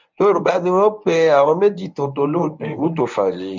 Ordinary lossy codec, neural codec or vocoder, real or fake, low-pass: MP3, 64 kbps; codec, 24 kHz, 0.9 kbps, WavTokenizer, medium speech release version 1; fake; 7.2 kHz